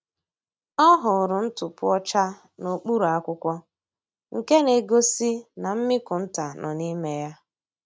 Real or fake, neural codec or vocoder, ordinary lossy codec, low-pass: real; none; none; none